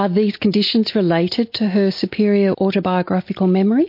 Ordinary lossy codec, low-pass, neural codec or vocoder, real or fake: MP3, 32 kbps; 5.4 kHz; none; real